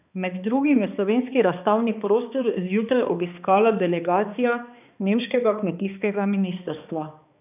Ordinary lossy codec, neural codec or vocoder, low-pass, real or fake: none; codec, 16 kHz, 2 kbps, X-Codec, HuBERT features, trained on balanced general audio; 3.6 kHz; fake